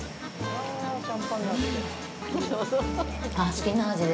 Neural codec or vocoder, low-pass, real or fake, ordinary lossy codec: none; none; real; none